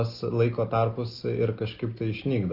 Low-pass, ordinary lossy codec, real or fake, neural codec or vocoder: 5.4 kHz; Opus, 32 kbps; real; none